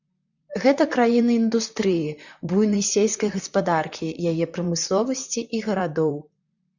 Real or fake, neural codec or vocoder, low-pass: fake; vocoder, 44.1 kHz, 128 mel bands, Pupu-Vocoder; 7.2 kHz